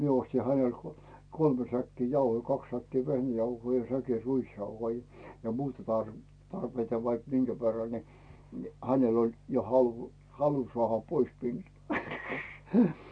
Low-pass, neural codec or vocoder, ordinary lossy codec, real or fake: 9.9 kHz; none; none; real